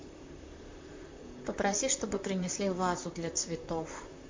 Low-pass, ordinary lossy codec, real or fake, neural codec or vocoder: 7.2 kHz; MP3, 48 kbps; fake; vocoder, 44.1 kHz, 128 mel bands, Pupu-Vocoder